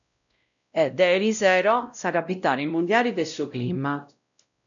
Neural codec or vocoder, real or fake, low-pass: codec, 16 kHz, 0.5 kbps, X-Codec, WavLM features, trained on Multilingual LibriSpeech; fake; 7.2 kHz